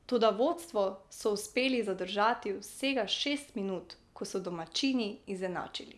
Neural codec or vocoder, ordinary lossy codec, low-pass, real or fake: none; none; none; real